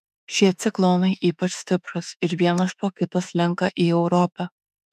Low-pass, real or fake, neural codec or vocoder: 14.4 kHz; fake; autoencoder, 48 kHz, 32 numbers a frame, DAC-VAE, trained on Japanese speech